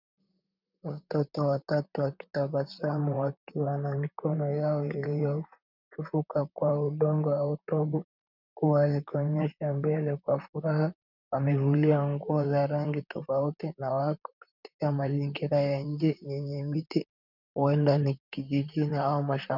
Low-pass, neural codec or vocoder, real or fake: 5.4 kHz; vocoder, 44.1 kHz, 128 mel bands, Pupu-Vocoder; fake